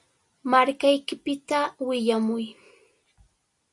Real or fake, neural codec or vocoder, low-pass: real; none; 10.8 kHz